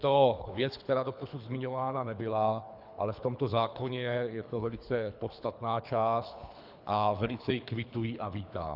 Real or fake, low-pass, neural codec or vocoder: fake; 5.4 kHz; codec, 24 kHz, 3 kbps, HILCodec